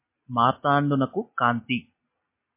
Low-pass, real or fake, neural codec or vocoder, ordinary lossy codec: 3.6 kHz; real; none; MP3, 24 kbps